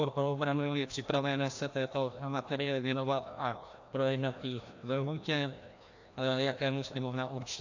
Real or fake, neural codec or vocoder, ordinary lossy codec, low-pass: fake; codec, 16 kHz, 1 kbps, FreqCodec, larger model; AAC, 48 kbps; 7.2 kHz